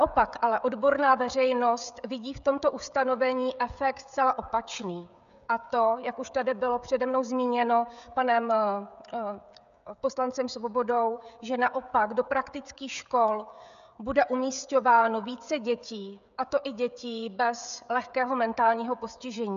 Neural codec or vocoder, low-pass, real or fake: codec, 16 kHz, 16 kbps, FreqCodec, smaller model; 7.2 kHz; fake